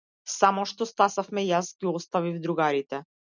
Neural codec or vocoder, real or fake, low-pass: none; real; 7.2 kHz